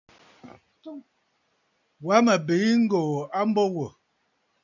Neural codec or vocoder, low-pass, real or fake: none; 7.2 kHz; real